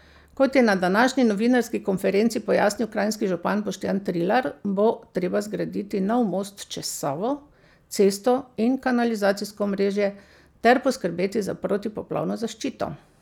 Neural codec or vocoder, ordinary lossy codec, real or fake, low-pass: none; none; real; 19.8 kHz